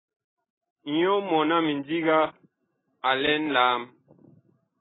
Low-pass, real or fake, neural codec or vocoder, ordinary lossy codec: 7.2 kHz; real; none; AAC, 16 kbps